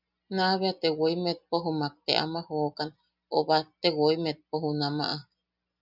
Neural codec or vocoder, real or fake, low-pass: none; real; 5.4 kHz